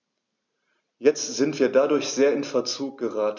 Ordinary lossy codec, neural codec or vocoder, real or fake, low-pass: none; none; real; 7.2 kHz